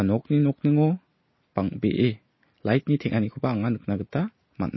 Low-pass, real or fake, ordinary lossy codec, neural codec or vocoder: 7.2 kHz; real; MP3, 24 kbps; none